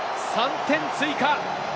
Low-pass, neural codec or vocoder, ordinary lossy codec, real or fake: none; none; none; real